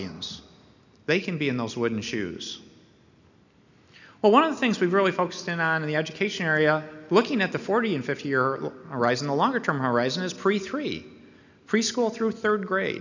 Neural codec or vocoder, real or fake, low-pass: none; real; 7.2 kHz